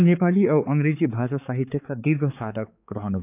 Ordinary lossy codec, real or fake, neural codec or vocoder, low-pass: none; fake; codec, 16 kHz, 4 kbps, X-Codec, HuBERT features, trained on balanced general audio; 3.6 kHz